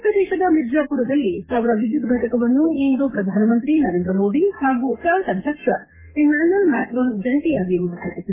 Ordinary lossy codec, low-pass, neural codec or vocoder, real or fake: MP3, 16 kbps; 3.6 kHz; codec, 32 kHz, 1.9 kbps, SNAC; fake